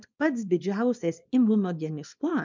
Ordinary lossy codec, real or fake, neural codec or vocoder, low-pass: MP3, 64 kbps; fake; codec, 24 kHz, 0.9 kbps, WavTokenizer, small release; 7.2 kHz